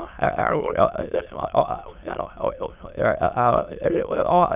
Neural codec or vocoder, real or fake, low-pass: autoencoder, 22.05 kHz, a latent of 192 numbers a frame, VITS, trained on many speakers; fake; 3.6 kHz